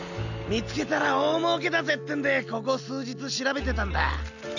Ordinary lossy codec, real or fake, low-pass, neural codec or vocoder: none; real; 7.2 kHz; none